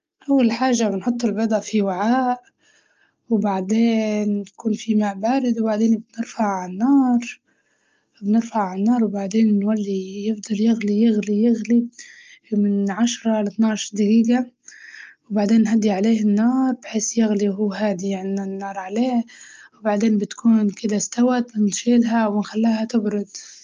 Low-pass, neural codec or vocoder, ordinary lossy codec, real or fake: 7.2 kHz; none; Opus, 24 kbps; real